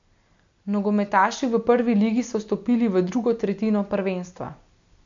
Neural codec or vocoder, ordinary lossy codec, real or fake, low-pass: none; MP3, 64 kbps; real; 7.2 kHz